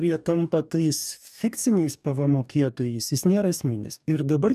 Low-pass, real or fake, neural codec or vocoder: 14.4 kHz; fake; codec, 44.1 kHz, 2.6 kbps, DAC